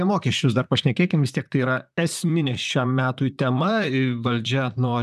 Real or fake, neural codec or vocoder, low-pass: fake; vocoder, 44.1 kHz, 128 mel bands, Pupu-Vocoder; 14.4 kHz